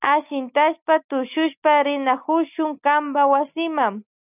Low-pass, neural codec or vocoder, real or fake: 3.6 kHz; none; real